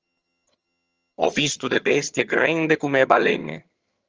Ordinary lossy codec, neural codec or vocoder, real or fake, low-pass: Opus, 24 kbps; vocoder, 22.05 kHz, 80 mel bands, HiFi-GAN; fake; 7.2 kHz